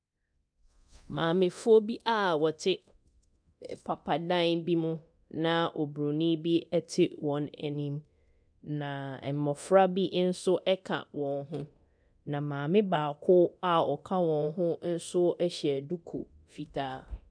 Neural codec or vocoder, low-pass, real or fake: codec, 24 kHz, 0.9 kbps, DualCodec; 9.9 kHz; fake